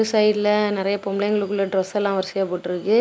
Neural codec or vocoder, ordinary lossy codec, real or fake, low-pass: none; none; real; none